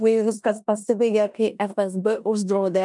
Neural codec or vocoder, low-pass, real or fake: codec, 16 kHz in and 24 kHz out, 0.9 kbps, LongCat-Audio-Codec, four codebook decoder; 10.8 kHz; fake